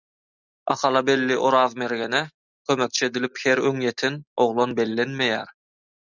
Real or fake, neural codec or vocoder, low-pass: real; none; 7.2 kHz